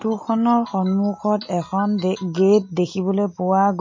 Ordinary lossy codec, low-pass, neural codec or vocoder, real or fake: MP3, 32 kbps; 7.2 kHz; none; real